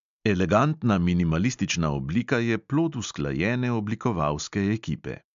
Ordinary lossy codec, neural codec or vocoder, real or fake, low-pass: MP3, 64 kbps; none; real; 7.2 kHz